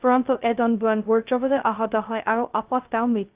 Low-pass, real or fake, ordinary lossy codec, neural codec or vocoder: 3.6 kHz; fake; Opus, 24 kbps; codec, 16 kHz, 0.2 kbps, FocalCodec